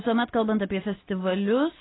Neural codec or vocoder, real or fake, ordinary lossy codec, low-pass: none; real; AAC, 16 kbps; 7.2 kHz